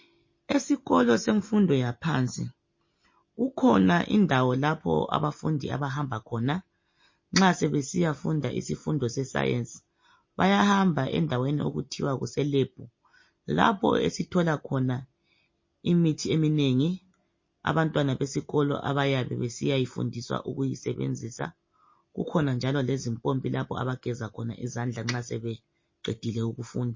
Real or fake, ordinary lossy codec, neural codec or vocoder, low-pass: real; MP3, 32 kbps; none; 7.2 kHz